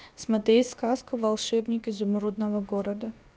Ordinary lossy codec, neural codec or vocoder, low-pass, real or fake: none; codec, 16 kHz, about 1 kbps, DyCAST, with the encoder's durations; none; fake